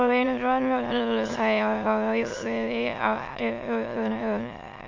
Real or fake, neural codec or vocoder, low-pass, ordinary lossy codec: fake; autoencoder, 22.05 kHz, a latent of 192 numbers a frame, VITS, trained on many speakers; 7.2 kHz; MP3, 48 kbps